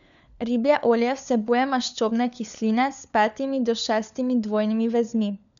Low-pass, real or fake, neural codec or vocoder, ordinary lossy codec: 7.2 kHz; fake; codec, 16 kHz, 4 kbps, FunCodec, trained on LibriTTS, 50 frames a second; none